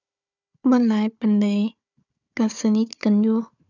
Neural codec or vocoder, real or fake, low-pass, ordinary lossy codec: codec, 16 kHz, 4 kbps, FunCodec, trained on Chinese and English, 50 frames a second; fake; 7.2 kHz; none